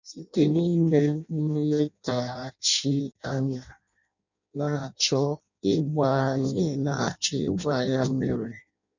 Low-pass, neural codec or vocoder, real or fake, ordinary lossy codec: 7.2 kHz; codec, 16 kHz in and 24 kHz out, 0.6 kbps, FireRedTTS-2 codec; fake; none